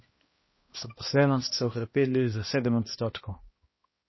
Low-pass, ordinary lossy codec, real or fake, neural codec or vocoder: 7.2 kHz; MP3, 24 kbps; fake; codec, 16 kHz, 1 kbps, X-Codec, HuBERT features, trained on balanced general audio